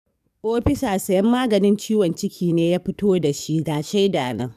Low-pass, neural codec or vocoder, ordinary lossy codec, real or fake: 14.4 kHz; codec, 44.1 kHz, 7.8 kbps, DAC; none; fake